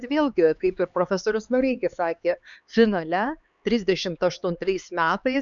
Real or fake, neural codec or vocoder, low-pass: fake; codec, 16 kHz, 4 kbps, X-Codec, HuBERT features, trained on LibriSpeech; 7.2 kHz